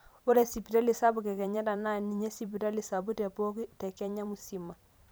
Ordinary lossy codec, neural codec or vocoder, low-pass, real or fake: none; vocoder, 44.1 kHz, 128 mel bands every 512 samples, BigVGAN v2; none; fake